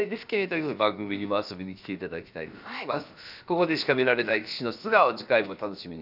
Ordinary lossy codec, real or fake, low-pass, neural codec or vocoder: none; fake; 5.4 kHz; codec, 16 kHz, about 1 kbps, DyCAST, with the encoder's durations